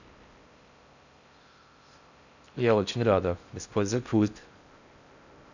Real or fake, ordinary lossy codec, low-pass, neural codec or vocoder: fake; none; 7.2 kHz; codec, 16 kHz in and 24 kHz out, 0.6 kbps, FocalCodec, streaming, 4096 codes